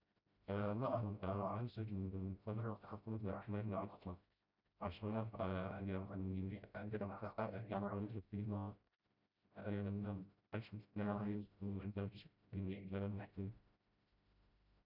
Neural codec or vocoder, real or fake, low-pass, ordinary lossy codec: codec, 16 kHz, 0.5 kbps, FreqCodec, smaller model; fake; 5.4 kHz; none